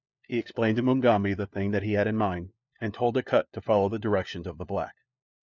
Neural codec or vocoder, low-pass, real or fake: codec, 16 kHz, 4 kbps, FunCodec, trained on LibriTTS, 50 frames a second; 7.2 kHz; fake